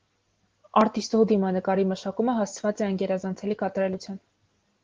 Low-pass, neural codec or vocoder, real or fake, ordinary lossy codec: 7.2 kHz; none; real; Opus, 16 kbps